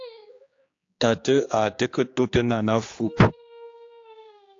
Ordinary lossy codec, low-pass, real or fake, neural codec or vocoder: AAC, 48 kbps; 7.2 kHz; fake; codec, 16 kHz, 2 kbps, X-Codec, HuBERT features, trained on general audio